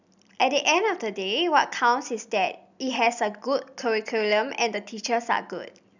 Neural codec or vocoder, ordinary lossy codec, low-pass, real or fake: none; none; 7.2 kHz; real